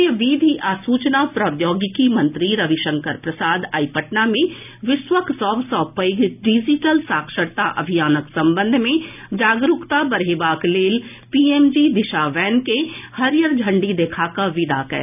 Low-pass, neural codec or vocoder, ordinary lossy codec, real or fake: 3.6 kHz; none; none; real